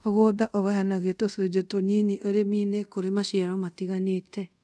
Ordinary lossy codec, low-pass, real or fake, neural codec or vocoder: none; none; fake; codec, 24 kHz, 0.5 kbps, DualCodec